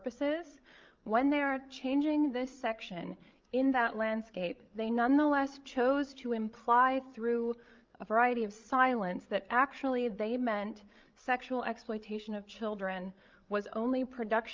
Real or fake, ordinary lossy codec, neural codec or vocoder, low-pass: fake; Opus, 32 kbps; codec, 16 kHz, 16 kbps, FreqCodec, larger model; 7.2 kHz